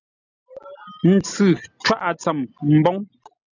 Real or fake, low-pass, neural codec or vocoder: real; 7.2 kHz; none